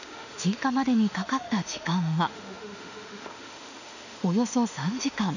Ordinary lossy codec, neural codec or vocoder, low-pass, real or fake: none; autoencoder, 48 kHz, 32 numbers a frame, DAC-VAE, trained on Japanese speech; 7.2 kHz; fake